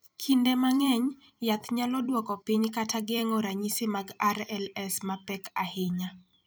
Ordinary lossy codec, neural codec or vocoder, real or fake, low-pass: none; vocoder, 44.1 kHz, 128 mel bands every 256 samples, BigVGAN v2; fake; none